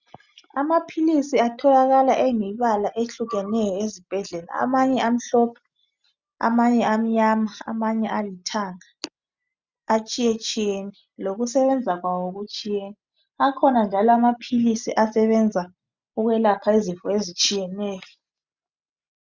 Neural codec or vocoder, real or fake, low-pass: none; real; 7.2 kHz